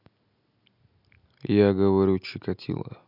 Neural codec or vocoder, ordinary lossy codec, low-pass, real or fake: none; none; 5.4 kHz; real